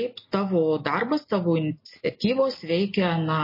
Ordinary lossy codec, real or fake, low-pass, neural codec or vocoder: MP3, 24 kbps; real; 5.4 kHz; none